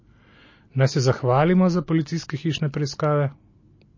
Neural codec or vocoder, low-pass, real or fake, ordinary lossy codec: none; 7.2 kHz; real; MP3, 32 kbps